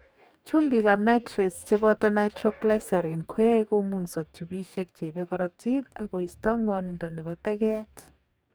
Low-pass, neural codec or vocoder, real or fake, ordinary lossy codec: none; codec, 44.1 kHz, 2.6 kbps, DAC; fake; none